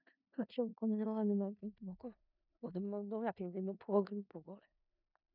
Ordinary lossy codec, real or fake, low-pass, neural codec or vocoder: none; fake; 5.4 kHz; codec, 16 kHz in and 24 kHz out, 0.4 kbps, LongCat-Audio-Codec, four codebook decoder